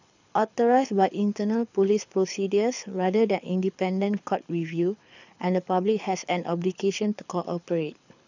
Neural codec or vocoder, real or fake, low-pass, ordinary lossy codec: codec, 24 kHz, 6 kbps, HILCodec; fake; 7.2 kHz; none